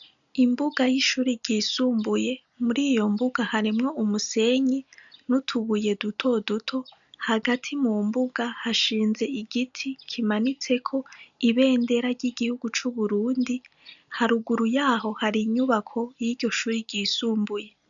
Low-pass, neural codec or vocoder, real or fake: 7.2 kHz; none; real